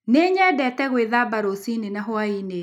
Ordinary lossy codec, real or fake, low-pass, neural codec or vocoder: none; real; 19.8 kHz; none